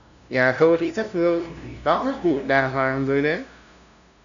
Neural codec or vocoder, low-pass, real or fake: codec, 16 kHz, 0.5 kbps, FunCodec, trained on LibriTTS, 25 frames a second; 7.2 kHz; fake